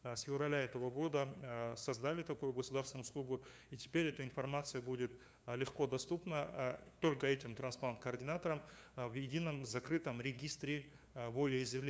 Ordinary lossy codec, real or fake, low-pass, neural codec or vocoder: none; fake; none; codec, 16 kHz, 2 kbps, FunCodec, trained on LibriTTS, 25 frames a second